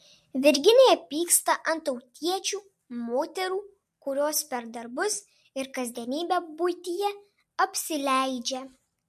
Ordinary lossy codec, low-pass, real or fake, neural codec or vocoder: MP3, 64 kbps; 14.4 kHz; real; none